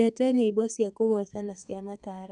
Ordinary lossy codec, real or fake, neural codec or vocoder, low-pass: none; fake; codec, 32 kHz, 1.9 kbps, SNAC; 10.8 kHz